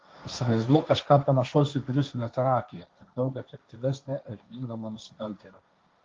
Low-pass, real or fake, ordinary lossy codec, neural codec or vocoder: 7.2 kHz; fake; Opus, 32 kbps; codec, 16 kHz, 1.1 kbps, Voila-Tokenizer